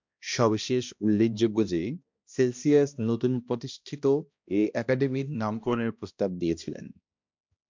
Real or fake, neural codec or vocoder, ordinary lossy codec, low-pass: fake; codec, 16 kHz, 1 kbps, X-Codec, HuBERT features, trained on balanced general audio; MP3, 64 kbps; 7.2 kHz